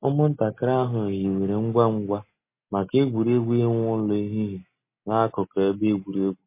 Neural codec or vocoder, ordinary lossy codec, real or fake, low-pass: none; MP3, 24 kbps; real; 3.6 kHz